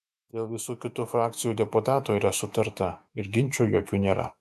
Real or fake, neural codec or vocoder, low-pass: fake; autoencoder, 48 kHz, 128 numbers a frame, DAC-VAE, trained on Japanese speech; 14.4 kHz